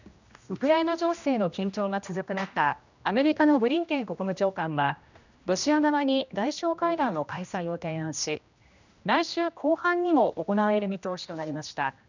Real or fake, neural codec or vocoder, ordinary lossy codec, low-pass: fake; codec, 16 kHz, 1 kbps, X-Codec, HuBERT features, trained on general audio; none; 7.2 kHz